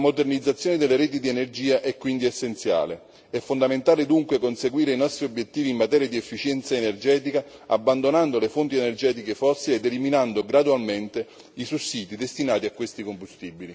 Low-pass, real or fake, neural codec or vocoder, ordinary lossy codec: none; real; none; none